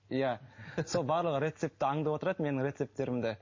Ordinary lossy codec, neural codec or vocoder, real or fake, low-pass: MP3, 32 kbps; none; real; 7.2 kHz